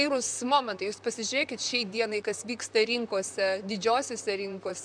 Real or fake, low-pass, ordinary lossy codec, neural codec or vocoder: fake; 9.9 kHz; MP3, 96 kbps; vocoder, 44.1 kHz, 128 mel bands, Pupu-Vocoder